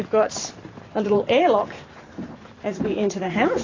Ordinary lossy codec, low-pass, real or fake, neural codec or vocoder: AAC, 32 kbps; 7.2 kHz; fake; vocoder, 44.1 kHz, 128 mel bands every 512 samples, BigVGAN v2